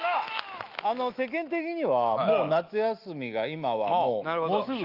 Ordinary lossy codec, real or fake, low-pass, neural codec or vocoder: Opus, 32 kbps; fake; 5.4 kHz; autoencoder, 48 kHz, 128 numbers a frame, DAC-VAE, trained on Japanese speech